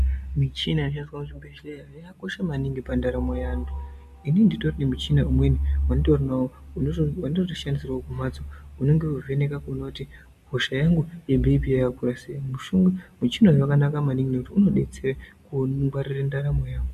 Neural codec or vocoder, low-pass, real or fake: none; 14.4 kHz; real